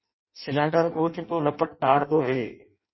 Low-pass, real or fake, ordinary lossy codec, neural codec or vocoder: 7.2 kHz; fake; MP3, 24 kbps; codec, 16 kHz in and 24 kHz out, 0.6 kbps, FireRedTTS-2 codec